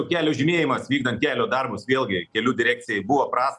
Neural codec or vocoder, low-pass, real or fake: none; 10.8 kHz; real